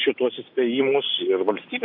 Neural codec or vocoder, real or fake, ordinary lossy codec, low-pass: none; real; AAC, 48 kbps; 5.4 kHz